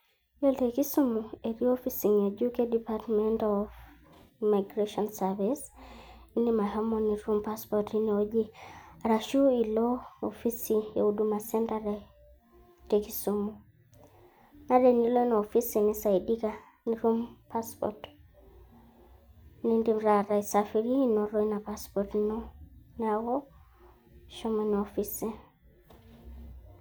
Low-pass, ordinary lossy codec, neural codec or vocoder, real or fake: none; none; none; real